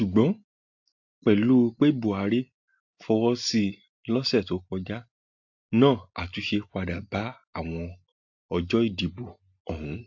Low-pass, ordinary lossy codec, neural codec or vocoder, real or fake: 7.2 kHz; AAC, 48 kbps; none; real